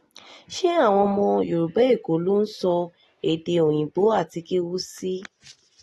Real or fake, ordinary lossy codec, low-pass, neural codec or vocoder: real; AAC, 32 kbps; 19.8 kHz; none